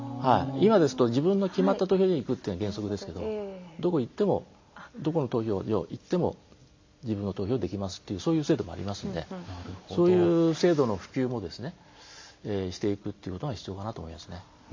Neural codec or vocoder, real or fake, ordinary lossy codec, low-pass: none; real; MP3, 64 kbps; 7.2 kHz